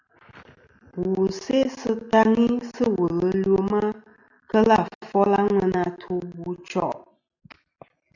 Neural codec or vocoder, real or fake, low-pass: none; real; 7.2 kHz